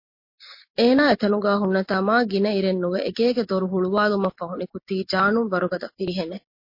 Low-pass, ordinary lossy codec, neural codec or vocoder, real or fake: 5.4 kHz; MP3, 32 kbps; none; real